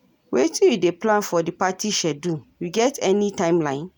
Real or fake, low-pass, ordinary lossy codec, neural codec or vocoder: real; none; none; none